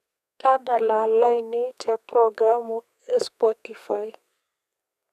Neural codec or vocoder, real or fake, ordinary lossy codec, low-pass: codec, 32 kHz, 1.9 kbps, SNAC; fake; none; 14.4 kHz